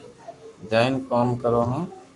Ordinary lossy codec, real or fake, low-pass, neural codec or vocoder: Opus, 64 kbps; fake; 10.8 kHz; codec, 44.1 kHz, 7.8 kbps, Pupu-Codec